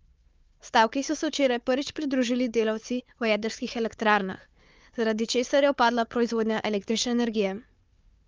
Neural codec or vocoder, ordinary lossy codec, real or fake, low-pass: codec, 16 kHz, 4 kbps, FunCodec, trained on Chinese and English, 50 frames a second; Opus, 24 kbps; fake; 7.2 kHz